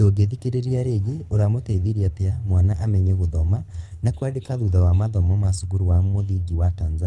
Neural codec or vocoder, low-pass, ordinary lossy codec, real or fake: codec, 24 kHz, 6 kbps, HILCodec; none; none; fake